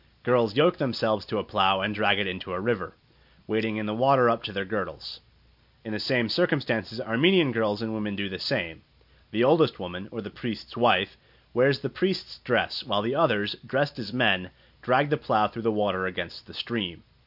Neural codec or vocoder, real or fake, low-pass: none; real; 5.4 kHz